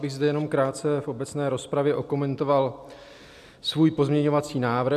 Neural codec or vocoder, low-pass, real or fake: none; 14.4 kHz; real